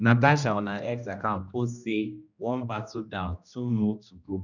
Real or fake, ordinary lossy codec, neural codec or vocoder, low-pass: fake; none; codec, 16 kHz, 1 kbps, X-Codec, HuBERT features, trained on general audio; 7.2 kHz